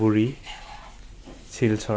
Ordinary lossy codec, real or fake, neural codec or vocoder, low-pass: none; real; none; none